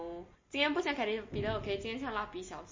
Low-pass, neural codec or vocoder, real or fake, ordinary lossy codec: 7.2 kHz; none; real; MP3, 32 kbps